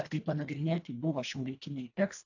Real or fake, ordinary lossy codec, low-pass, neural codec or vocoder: fake; AAC, 48 kbps; 7.2 kHz; codec, 24 kHz, 1.5 kbps, HILCodec